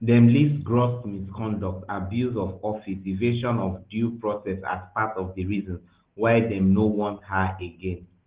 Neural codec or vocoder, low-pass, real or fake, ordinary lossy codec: none; 3.6 kHz; real; Opus, 16 kbps